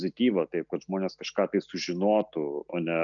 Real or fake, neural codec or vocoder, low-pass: real; none; 7.2 kHz